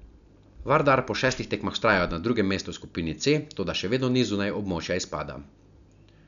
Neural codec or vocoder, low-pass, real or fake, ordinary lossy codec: none; 7.2 kHz; real; none